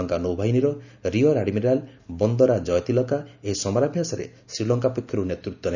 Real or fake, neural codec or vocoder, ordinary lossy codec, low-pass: real; none; none; 7.2 kHz